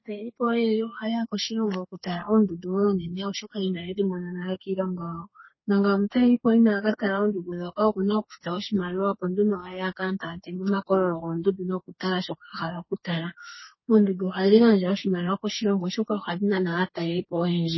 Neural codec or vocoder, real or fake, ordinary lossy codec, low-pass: codec, 32 kHz, 1.9 kbps, SNAC; fake; MP3, 24 kbps; 7.2 kHz